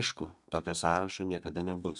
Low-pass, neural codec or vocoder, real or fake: 10.8 kHz; codec, 32 kHz, 1.9 kbps, SNAC; fake